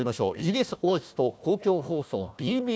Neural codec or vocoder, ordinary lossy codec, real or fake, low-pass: codec, 16 kHz, 1 kbps, FunCodec, trained on Chinese and English, 50 frames a second; none; fake; none